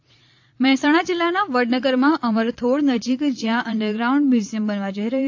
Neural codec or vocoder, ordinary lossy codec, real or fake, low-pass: vocoder, 22.05 kHz, 80 mel bands, Vocos; AAC, 48 kbps; fake; 7.2 kHz